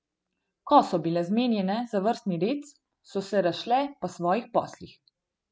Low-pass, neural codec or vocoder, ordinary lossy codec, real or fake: none; none; none; real